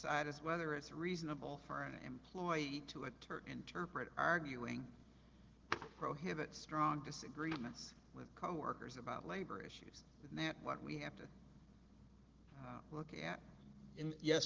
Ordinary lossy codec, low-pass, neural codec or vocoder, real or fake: Opus, 16 kbps; 7.2 kHz; none; real